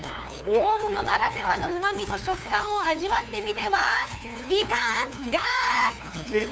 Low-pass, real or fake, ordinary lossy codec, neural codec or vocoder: none; fake; none; codec, 16 kHz, 2 kbps, FunCodec, trained on LibriTTS, 25 frames a second